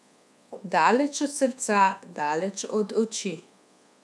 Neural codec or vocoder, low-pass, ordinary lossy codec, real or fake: codec, 24 kHz, 1.2 kbps, DualCodec; none; none; fake